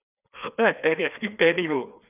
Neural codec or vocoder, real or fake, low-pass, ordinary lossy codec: codec, 16 kHz, 2 kbps, FreqCodec, larger model; fake; 3.6 kHz; none